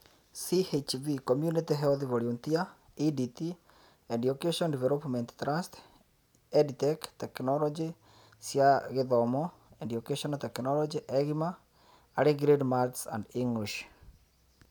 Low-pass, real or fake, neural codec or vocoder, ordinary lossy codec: none; real; none; none